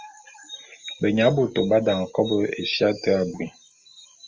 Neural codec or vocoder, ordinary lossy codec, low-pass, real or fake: none; Opus, 32 kbps; 7.2 kHz; real